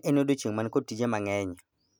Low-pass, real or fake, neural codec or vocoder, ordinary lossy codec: none; real; none; none